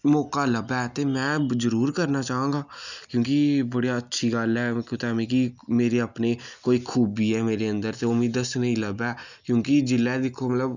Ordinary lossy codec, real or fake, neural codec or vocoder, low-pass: none; real; none; 7.2 kHz